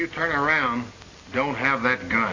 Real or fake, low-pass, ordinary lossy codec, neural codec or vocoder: real; 7.2 kHz; AAC, 32 kbps; none